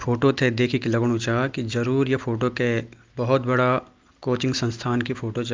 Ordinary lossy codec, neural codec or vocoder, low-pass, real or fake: Opus, 24 kbps; none; 7.2 kHz; real